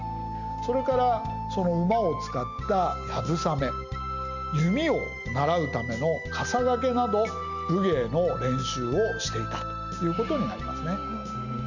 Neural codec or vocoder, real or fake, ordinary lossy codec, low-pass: none; real; Opus, 64 kbps; 7.2 kHz